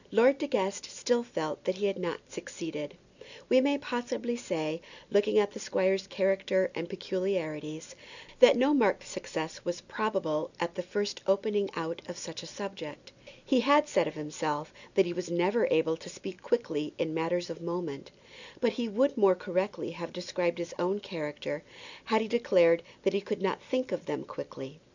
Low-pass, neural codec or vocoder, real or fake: 7.2 kHz; none; real